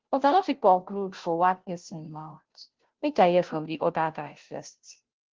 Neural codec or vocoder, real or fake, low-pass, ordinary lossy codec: codec, 16 kHz, 0.5 kbps, FunCodec, trained on Chinese and English, 25 frames a second; fake; 7.2 kHz; Opus, 16 kbps